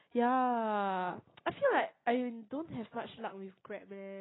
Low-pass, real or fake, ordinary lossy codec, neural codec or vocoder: 7.2 kHz; real; AAC, 16 kbps; none